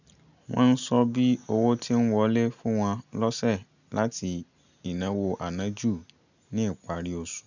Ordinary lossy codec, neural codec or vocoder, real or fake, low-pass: none; none; real; 7.2 kHz